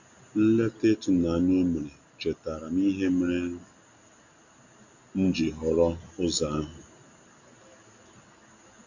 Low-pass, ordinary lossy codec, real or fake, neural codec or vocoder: 7.2 kHz; none; real; none